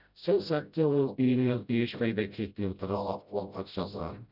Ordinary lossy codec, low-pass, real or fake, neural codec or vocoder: none; 5.4 kHz; fake; codec, 16 kHz, 0.5 kbps, FreqCodec, smaller model